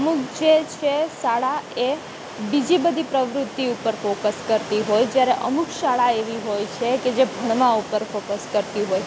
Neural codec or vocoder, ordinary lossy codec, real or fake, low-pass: none; none; real; none